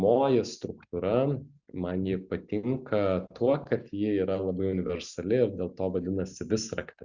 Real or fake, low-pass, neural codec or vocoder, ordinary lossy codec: real; 7.2 kHz; none; Opus, 64 kbps